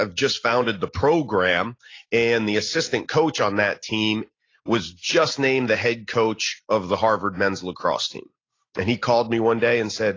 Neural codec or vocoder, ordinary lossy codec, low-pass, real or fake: none; AAC, 32 kbps; 7.2 kHz; real